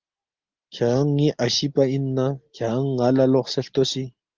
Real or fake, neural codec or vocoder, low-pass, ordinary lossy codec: real; none; 7.2 kHz; Opus, 24 kbps